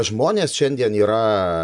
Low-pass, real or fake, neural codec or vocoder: 10.8 kHz; real; none